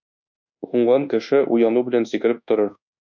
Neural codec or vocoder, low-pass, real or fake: codec, 24 kHz, 1.2 kbps, DualCodec; 7.2 kHz; fake